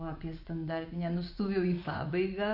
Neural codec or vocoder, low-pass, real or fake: vocoder, 24 kHz, 100 mel bands, Vocos; 5.4 kHz; fake